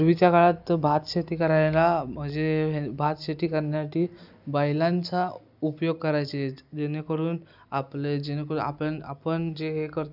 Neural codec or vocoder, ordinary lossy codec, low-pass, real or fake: none; none; 5.4 kHz; real